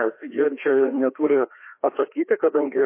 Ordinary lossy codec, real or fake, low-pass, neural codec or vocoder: MP3, 24 kbps; fake; 3.6 kHz; codec, 16 kHz, 2 kbps, FreqCodec, larger model